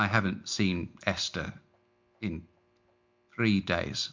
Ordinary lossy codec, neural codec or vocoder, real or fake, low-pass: MP3, 64 kbps; none; real; 7.2 kHz